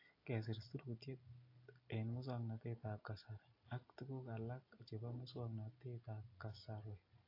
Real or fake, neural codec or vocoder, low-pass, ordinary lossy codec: real; none; 5.4 kHz; AAC, 32 kbps